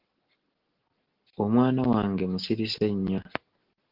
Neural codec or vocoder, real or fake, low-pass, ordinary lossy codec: none; real; 5.4 kHz; Opus, 16 kbps